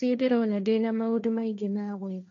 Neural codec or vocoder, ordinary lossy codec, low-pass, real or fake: codec, 16 kHz, 1.1 kbps, Voila-Tokenizer; none; 7.2 kHz; fake